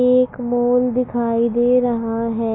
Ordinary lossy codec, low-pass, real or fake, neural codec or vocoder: AAC, 16 kbps; 7.2 kHz; real; none